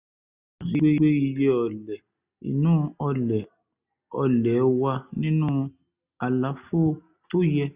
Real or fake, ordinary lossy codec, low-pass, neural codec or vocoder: real; Opus, 64 kbps; 3.6 kHz; none